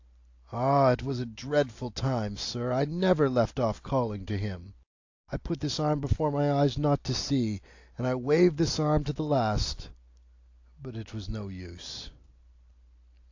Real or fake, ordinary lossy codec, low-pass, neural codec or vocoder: real; AAC, 48 kbps; 7.2 kHz; none